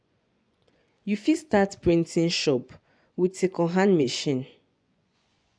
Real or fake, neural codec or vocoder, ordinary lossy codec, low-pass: fake; vocoder, 24 kHz, 100 mel bands, Vocos; none; 9.9 kHz